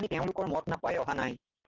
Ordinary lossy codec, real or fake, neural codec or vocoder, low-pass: Opus, 16 kbps; real; none; 7.2 kHz